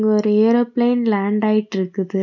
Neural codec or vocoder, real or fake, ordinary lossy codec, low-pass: none; real; none; 7.2 kHz